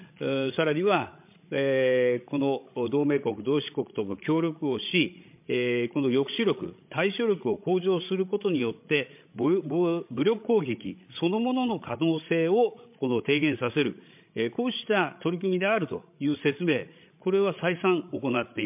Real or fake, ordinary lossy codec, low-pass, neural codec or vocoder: fake; MP3, 32 kbps; 3.6 kHz; codec, 16 kHz, 16 kbps, FreqCodec, larger model